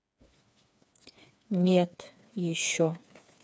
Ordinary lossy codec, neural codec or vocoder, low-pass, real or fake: none; codec, 16 kHz, 4 kbps, FreqCodec, smaller model; none; fake